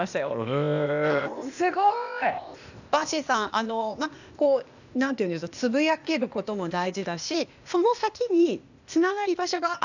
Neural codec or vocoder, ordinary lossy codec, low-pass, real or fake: codec, 16 kHz, 0.8 kbps, ZipCodec; none; 7.2 kHz; fake